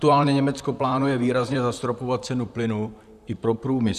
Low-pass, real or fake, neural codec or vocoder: 14.4 kHz; fake; vocoder, 44.1 kHz, 128 mel bands, Pupu-Vocoder